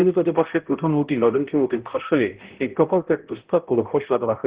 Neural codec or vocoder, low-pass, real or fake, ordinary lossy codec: codec, 16 kHz, 0.5 kbps, X-Codec, HuBERT features, trained on balanced general audio; 3.6 kHz; fake; Opus, 16 kbps